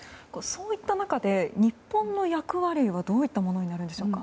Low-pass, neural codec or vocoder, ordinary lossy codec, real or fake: none; none; none; real